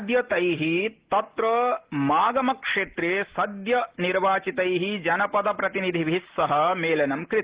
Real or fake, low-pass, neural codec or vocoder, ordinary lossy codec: real; 3.6 kHz; none; Opus, 16 kbps